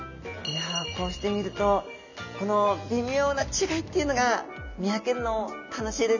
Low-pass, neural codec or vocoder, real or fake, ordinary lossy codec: 7.2 kHz; none; real; none